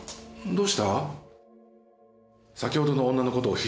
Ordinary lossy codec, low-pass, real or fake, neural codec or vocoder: none; none; real; none